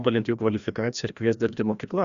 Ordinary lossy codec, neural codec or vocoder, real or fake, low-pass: Opus, 64 kbps; codec, 16 kHz, 1 kbps, FreqCodec, larger model; fake; 7.2 kHz